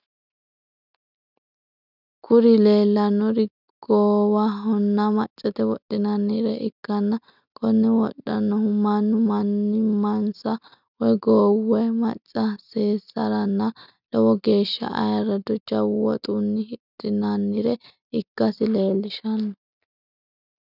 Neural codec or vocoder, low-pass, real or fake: none; 5.4 kHz; real